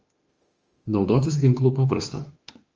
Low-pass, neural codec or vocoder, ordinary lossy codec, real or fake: 7.2 kHz; autoencoder, 48 kHz, 32 numbers a frame, DAC-VAE, trained on Japanese speech; Opus, 24 kbps; fake